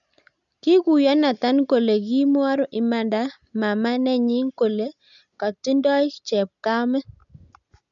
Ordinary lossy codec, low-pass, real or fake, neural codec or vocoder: none; 7.2 kHz; real; none